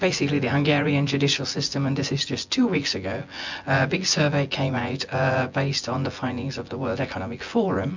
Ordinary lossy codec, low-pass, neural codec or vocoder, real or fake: MP3, 64 kbps; 7.2 kHz; vocoder, 24 kHz, 100 mel bands, Vocos; fake